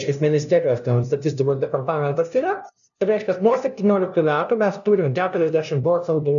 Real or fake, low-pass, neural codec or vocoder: fake; 7.2 kHz; codec, 16 kHz, 0.5 kbps, FunCodec, trained on LibriTTS, 25 frames a second